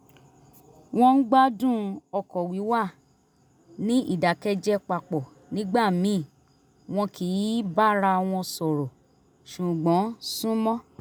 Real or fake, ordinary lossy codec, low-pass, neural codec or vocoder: real; none; none; none